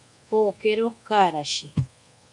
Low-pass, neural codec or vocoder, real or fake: 10.8 kHz; codec, 24 kHz, 1.2 kbps, DualCodec; fake